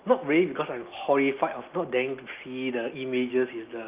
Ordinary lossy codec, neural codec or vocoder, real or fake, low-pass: Opus, 24 kbps; none; real; 3.6 kHz